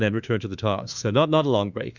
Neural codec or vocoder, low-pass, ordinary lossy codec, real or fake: autoencoder, 48 kHz, 32 numbers a frame, DAC-VAE, trained on Japanese speech; 7.2 kHz; Opus, 64 kbps; fake